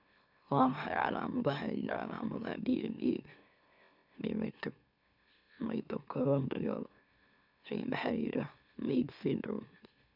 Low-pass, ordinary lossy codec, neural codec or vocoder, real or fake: 5.4 kHz; none; autoencoder, 44.1 kHz, a latent of 192 numbers a frame, MeloTTS; fake